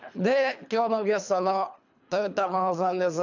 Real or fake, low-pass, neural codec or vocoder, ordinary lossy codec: fake; 7.2 kHz; codec, 24 kHz, 3 kbps, HILCodec; none